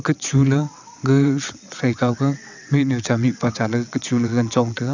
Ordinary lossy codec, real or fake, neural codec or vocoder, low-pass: none; fake; vocoder, 22.05 kHz, 80 mel bands, WaveNeXt; 7.2 kHz